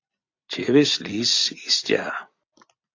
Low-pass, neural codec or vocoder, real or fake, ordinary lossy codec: 7.2 kHz; vocoder, 44.1 kHz, 128 mel bands every 256 samples, BigVGAN v2; fake; AAC, 48 kbps